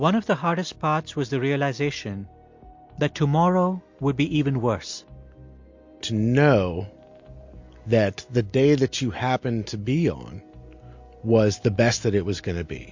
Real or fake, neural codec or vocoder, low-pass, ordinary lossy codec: real; none; 7.2 kHz; MP3, 48 kbps